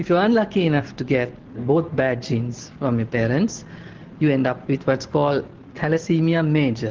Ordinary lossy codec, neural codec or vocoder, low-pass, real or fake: Opus, 16 kbps; none; 7.2 kHz; real